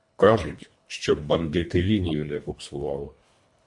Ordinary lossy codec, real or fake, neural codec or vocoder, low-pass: MP3, 48 kbps; fake; codec, 24 kHz, 1.5 kbps, HILCodec; 10.8 kHz